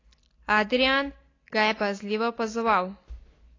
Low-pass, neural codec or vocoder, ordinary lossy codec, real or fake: 7.2 kHz; none; AAC, 32 kbps; real